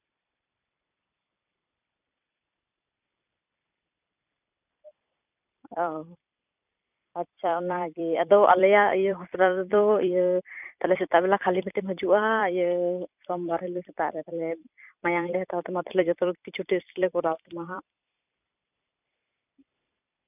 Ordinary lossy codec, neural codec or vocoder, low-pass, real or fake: none; vocoder, 44.1 kHz, 128 mel bands every 256 samples, BigVGAN v2; 3.6 kHz; fake